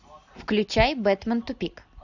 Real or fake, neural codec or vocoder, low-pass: real; none; 7.2 kHz